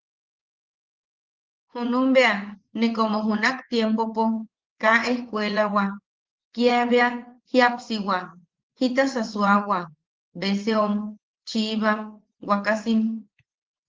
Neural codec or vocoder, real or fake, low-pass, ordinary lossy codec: vocoder, 44.1 kHz, 80 mel bands, Vocos; fake; 7.2 kHz; Opus, 16 kbps